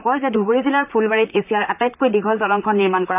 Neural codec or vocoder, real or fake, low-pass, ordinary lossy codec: vocoder, 44.1 kHz, 128 mel bands, Pupu-Vocoder; fake; 3.6 kHz; none